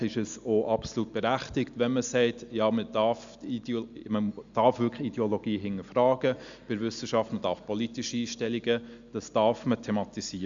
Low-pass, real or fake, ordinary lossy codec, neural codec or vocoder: 7.2 kHz; real; Opus, 64 kbps; none